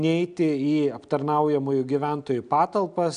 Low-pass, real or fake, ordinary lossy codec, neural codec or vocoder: 9.9 kHz; real; AAC, 96 kbps; none